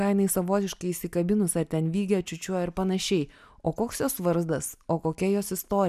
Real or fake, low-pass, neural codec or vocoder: real; 14.4 kHz; none